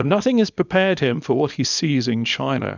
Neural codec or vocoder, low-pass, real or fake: codec, 24 kHz, 0.9 kbps, WavTokenizer, small release; 7.2 kHz; fake